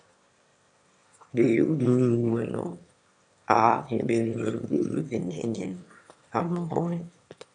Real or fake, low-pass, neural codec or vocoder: fake; 9.9 kHz; autoencoder, 22.05 kHz, a latent of 192 numbers a frame, VITS, trained on one speaker